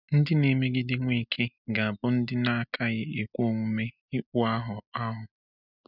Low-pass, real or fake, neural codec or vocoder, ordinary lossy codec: 5.4 kHz; real; none; none